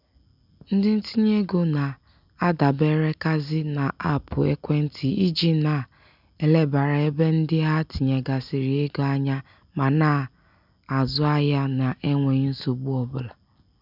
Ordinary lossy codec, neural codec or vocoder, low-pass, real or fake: none; none; 5.4 kHz; real